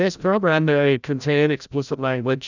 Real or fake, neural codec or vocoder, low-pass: fake; codec, 16 kHz, 0.5 kbps, FreqCodec, larger model; 7.2 kHz